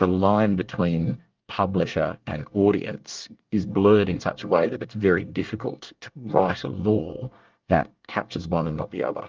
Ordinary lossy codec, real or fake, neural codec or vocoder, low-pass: Opus, 32 kbps; fake; codec, 24 kHz, 1 kbps, SNAC; 7.2 kHz